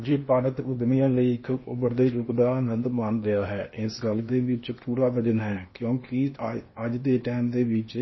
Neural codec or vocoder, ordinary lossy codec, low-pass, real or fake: codec, 16 kHz in and 24 kHz out, 0.8 kbps, FocalCodec, streaming, 65536 codes; MP3, 24 kbps; 7.2 kHz; fake